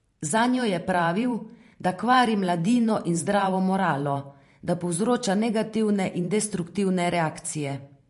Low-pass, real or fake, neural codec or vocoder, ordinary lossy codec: 14.4 kHz; fake; vocoder, 44.1 kHz, 128 mel bands every 512 samples, BigVGAN v2; MP3, 48 kbps